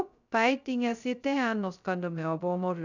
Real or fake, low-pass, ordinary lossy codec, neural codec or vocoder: fake; 7.2 kHz; none; codec, 16 kHz, 0.2 kbps, FocalCodec